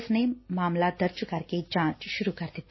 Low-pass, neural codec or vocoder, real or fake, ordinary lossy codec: 7.2 kHz; none; real; MP3, 24 kbps